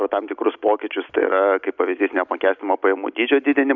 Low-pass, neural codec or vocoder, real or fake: 7.2 kHz; none; real